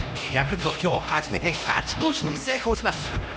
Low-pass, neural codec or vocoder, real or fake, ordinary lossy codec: none; codec, 16 kHz, 1 kbps, X-Codec, HuBERT features, trained on LibriSpeech; fake; none